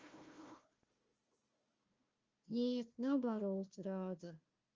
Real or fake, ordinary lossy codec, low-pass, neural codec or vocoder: fake; Opus, 24 kbps; 7.2 kHz; codec, 16 kHz, 1 kbps, FunCodec, trained on Chinese and English, 50 frames a second